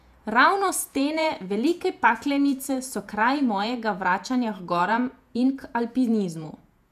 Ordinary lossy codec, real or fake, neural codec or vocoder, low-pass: none; fake; vocoder, 48 kHz, 128 mel bands, Vocos; 14.4 kHz